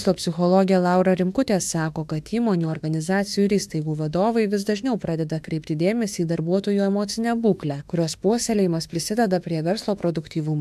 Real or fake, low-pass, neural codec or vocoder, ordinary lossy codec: fake; 14.4 kHz; autoencoder, 48 kHz, 32 numbers a frame, DAC-VAE, trained on Japanese speech; AAC, 96 kbps